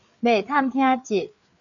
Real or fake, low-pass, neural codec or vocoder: fake; 7.2 kHz; codec, 16 kHz, 4 kbps, FreqCodec, larger model